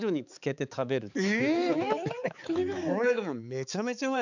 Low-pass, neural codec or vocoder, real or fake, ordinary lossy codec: 7.2 kHz; codec, 16 kHz, 4 kbps, X-Codec, HuBERT features, trained on balanced general audio; fake; none